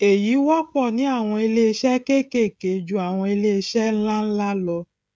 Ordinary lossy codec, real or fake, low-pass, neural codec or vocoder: none; fake; none; codec, 16 kHz, 16 kbps, FreqCodec, smaller model